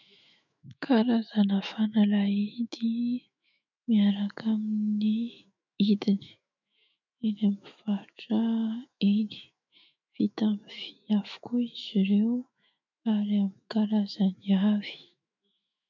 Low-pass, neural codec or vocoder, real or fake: 7.2 kHz; autoencoder, 48 kHz, 128 numbers a frame, DAC-VAE, trained on Japanese speech; fake